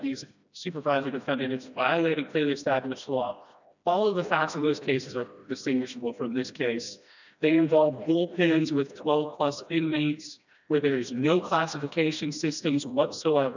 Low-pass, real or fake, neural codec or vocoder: 7.2 kHz; fake; codec, 16 kHz, 1 kbps, FreqCodec, smaller model